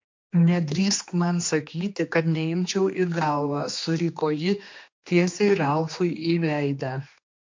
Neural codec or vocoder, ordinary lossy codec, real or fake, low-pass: codec, 16 kHz, 2 kbps, X-Codec, HuBERT features, trained on general audio; MP3, 48 kbps; fake; 7.2 kHz